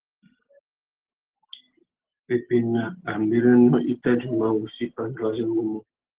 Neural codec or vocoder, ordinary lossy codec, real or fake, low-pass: none; Opus, 16 kbps; real; 3.6 kHz